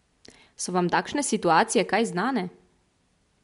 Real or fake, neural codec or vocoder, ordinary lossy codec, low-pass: real; none; MP3, 64 kbps; 10.8 kHz